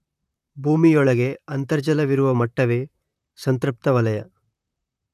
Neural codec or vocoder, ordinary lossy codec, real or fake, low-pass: vocoder, 44.1 kHz, 128 mel bands, Pupu-Vocoder; none; fake; 14.4 kHz